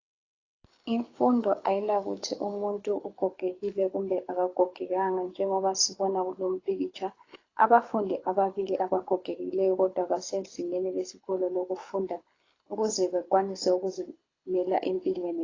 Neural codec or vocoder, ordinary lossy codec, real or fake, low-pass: codec, 24 kHz, 6 kbps, HILCodec; AAC, 32 kbps; fake; 7.2 kHz